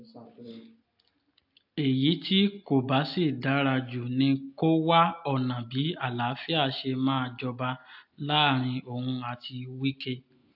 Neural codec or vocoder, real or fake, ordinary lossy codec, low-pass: none; real; AAC, 48 kbps; 5.4 kHz